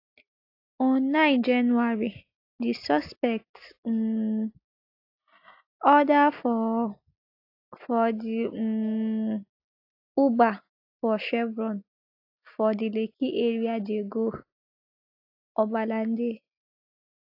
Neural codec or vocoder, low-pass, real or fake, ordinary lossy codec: none; 5.4 kHz; real; AAC, 32 kbps